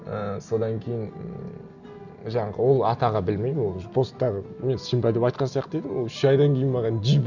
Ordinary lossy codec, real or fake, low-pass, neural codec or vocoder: none; real; 7.2 kHz; none